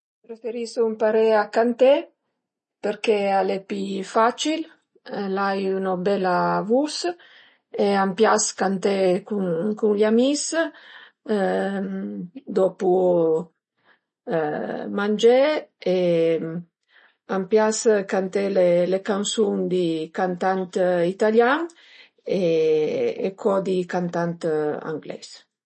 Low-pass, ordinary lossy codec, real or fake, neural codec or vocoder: 10.8 kHz; MP3, 32 kbps; real; none